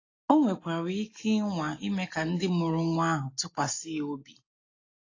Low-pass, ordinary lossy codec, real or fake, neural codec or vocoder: 7.2 kHz; AAC, 32 kbps; real; none